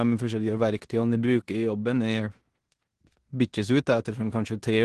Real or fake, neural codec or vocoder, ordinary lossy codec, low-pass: fake; codec, 16 kHz in and 24 kHz out, 0.9 kbps, LongCat-Audio-Codec, four codebook decoder; Opus, 16 kbps; 10.8 kHz